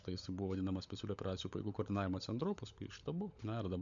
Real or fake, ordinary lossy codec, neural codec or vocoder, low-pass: fake; MP3, 64 kbps; codec, 16 kHz, 16 kbps, FunCodec, trained on LibriTTS, 50 frames a second; 7.2 kHz